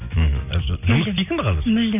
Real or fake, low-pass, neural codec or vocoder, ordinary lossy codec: real; 3.6 kHz; none; none